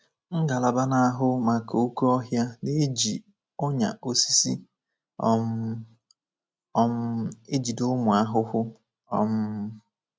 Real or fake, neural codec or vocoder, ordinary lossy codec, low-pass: real; none; none; none